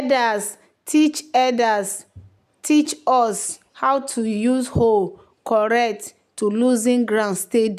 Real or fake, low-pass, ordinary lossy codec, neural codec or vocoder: real; 14.4 kHz; none; none